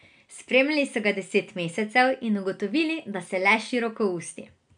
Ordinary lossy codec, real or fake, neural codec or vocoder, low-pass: none; real; none; 9.9 kHz